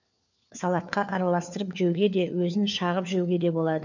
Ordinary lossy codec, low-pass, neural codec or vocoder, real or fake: none; 7.2 kHz; codec, 16 kHz, 4 kbps, FunCodec, trained on LibriTTS, 50 frames a second; fake